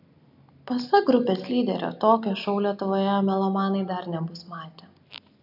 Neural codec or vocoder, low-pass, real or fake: none; 5.4 kHz; real